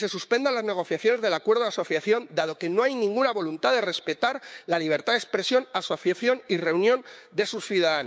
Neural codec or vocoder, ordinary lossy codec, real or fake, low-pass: codec, 16 kHz, 6 kbps, DAC; none; fake; none